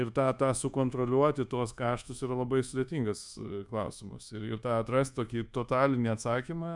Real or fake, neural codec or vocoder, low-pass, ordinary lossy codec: fake; codec, 24 kHz, 1.2 kbps, DualCodec; 10.8 kHz; MP3, 96 kbps